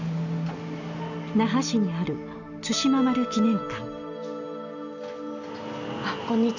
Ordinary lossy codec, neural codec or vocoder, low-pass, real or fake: none; none; 7.2 kHz; real